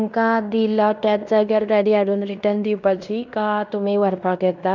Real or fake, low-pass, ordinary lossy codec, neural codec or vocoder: fake; 7.2 kHz; none; codec, 16 kHz in and 24 kHz out, 0.9 kbps, LongCat-Audio-Codec, fine tuned four codebook decoder